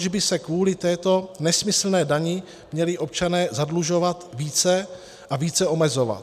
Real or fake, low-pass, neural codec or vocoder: real; 14.4 kHz; none